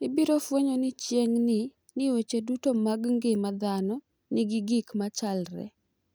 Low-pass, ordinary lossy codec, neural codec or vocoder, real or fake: none; none; none; real